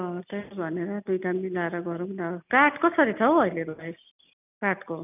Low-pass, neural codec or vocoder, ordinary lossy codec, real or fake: 3.6 kHz; none; none; real